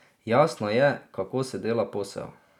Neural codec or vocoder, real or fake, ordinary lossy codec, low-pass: none; real; none; 19.8 kHz